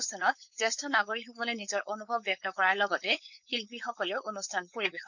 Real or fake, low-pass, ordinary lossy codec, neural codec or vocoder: fake; 7.2 kHz; none; codec, 16 kHz, 4.8 kbps, FACodec